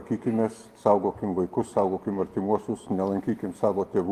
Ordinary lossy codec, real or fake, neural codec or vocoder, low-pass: Opus, 24 kbps; real; none; 14.4 kHz